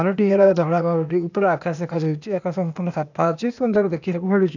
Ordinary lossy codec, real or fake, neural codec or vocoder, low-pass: none; fake; codec, 16 kHz, 0.8 kbps, ZipCodec; 7.2 kHz